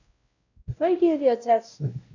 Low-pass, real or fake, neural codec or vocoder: 7.2 kHz; fake; codec, 16 kHz, 0.5 kbps, X-Codec, WavLM features, trained on Multilingual LibriSpeech